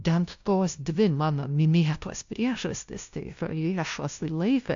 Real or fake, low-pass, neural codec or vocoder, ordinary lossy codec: fake; 7.2 kHz; codec, 16 kHz, 0.5 kbps, FunCodec, trained on LibriTTS, 25 frames a second; AAC, 64 kbps